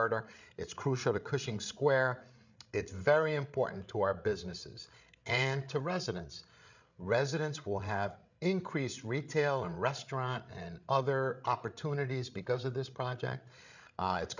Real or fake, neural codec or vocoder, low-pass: fake; codec, 16 kHz, 8 kbps, FreqCodec, larger model; 7.2 kHz